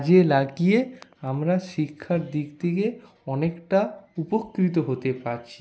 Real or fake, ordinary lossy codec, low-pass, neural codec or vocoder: real; none; none; none